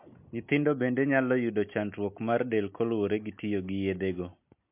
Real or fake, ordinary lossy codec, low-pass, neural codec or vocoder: real; MP3, 32 kbps; 3.6 kHz; none